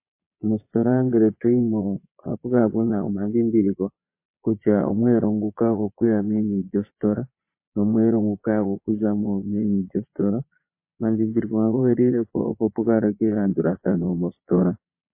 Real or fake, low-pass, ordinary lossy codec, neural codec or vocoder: fake; 3.6 kHz; MP3, 24 kbps; vocoder, 22.05 kHz, 80 mel bands, Vocos